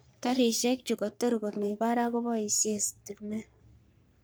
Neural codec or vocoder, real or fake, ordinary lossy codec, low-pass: codec, 44.1 kHz, 3.4 kbps, Pupu-Codec; fake; none; none